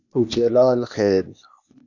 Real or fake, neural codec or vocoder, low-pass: fake; codec, 16 kHz, 0.8 kbps, ZipCodec; 7.2 kHz